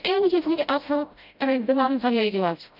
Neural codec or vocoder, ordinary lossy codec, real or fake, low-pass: codec, 16 kHz, 0.5 kbps, FreqCodec, smaller model; none; fake; 5.4 kHz